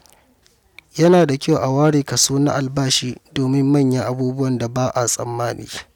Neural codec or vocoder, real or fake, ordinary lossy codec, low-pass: none; real; none; 19.8 kHz